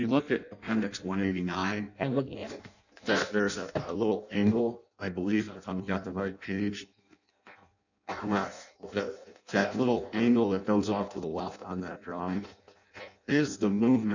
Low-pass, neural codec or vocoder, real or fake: 7.2 kHz; codec, 16 kHz in and 24 kHz out, 0.6 kbps, FireRedTTS-2 codec; fake